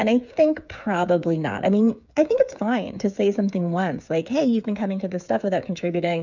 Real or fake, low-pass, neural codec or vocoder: fake; 7.2 kHz; codec, 16 kHz, 8 kbps, FreqCodec, smaller model